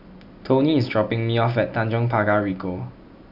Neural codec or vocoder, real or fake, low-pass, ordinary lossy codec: none; real; 5.4 kHz; none